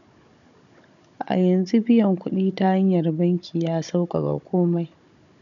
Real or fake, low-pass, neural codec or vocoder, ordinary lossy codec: fake; 7.2 kHz; codec, 16 kHz, 16 kbps, FunCodec, trained on Chinese and English, 50 frames a second; none